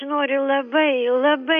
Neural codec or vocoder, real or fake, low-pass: none; real; 7.2 kHz